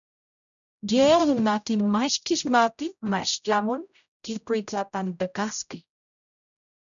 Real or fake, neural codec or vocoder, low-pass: fake; codec, 16 kHz, 0.5 kbps, X-Codec, HuBERT features, trained on general audio; 7.2 kHz